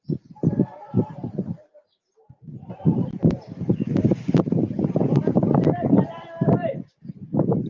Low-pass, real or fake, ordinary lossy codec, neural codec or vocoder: 7.2 kHz; fake; Opus, 24 kbps; vocoder, 44.1 kHz, 128 mel bands every 512 samples, BigVGAN v2